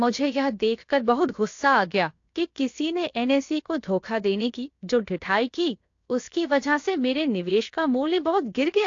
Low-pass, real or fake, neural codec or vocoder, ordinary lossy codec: 7.2 kHz; fake; codec, 16 kHz, 0.7 kbps, FocalCodec; AAC, 48 kbps